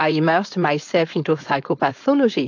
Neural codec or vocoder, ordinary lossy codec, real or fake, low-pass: codec, 16 kHz, 4.8 kbps, FACodec; AAC, 48 kbps; fake; 7.2 kHz